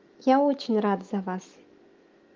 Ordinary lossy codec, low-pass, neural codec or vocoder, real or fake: Opus, 32 kbps; 7.2 kHz; autoencoder, 48 kHz, 128 numbers a frame, DAC-VAE, trained on Japanese speech; fake